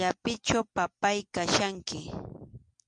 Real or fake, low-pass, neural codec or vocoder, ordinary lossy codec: real; 9.9 kHz; none; MP3, 64 kbps